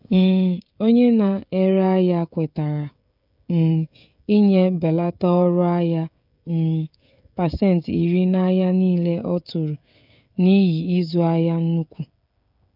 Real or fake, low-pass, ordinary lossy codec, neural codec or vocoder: fake; 5.4 kHz; none; codec, 16 kHz, 16 kbps, FreqCodec, smaller model